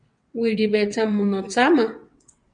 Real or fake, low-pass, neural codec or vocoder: fake; 9.9 kHz; vocoder, 22.05 kHz, 80 mel bands, WaveNeXt